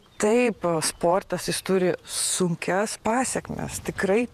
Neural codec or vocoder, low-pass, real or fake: vocoder, 48 kHz, 128 mel bands, Vocos; 14.4 kHz; fake